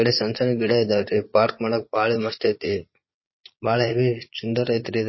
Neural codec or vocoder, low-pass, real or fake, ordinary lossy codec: vocoder, 22.05 kHz, 80 mel bands, Vocos; 7.2 kHz; fake; MP3, 24 kbps